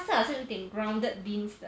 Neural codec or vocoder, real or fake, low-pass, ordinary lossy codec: none; real; none; none